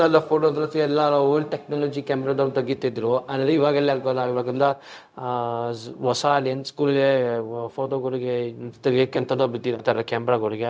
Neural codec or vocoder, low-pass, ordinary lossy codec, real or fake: codec, 16 kHz, 0.4 kbps, LongCat-Audio-Codec; none; none; fake